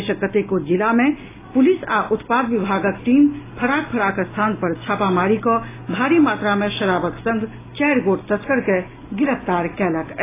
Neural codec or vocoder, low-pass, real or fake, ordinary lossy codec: none; 3.6 kHz; real; AAC, 16 kbps